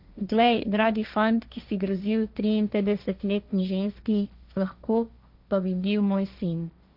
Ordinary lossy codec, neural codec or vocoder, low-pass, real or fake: none; codec, 16 kHz, 1.1 kbps, Voila-Tokenizer; 5.4 kHz; fake